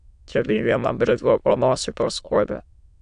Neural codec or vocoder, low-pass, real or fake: autoencoder, 22.05 kHz, a latent of 192 numbers a frame, VITS, trained on many speakers; 9.9 kHz; fake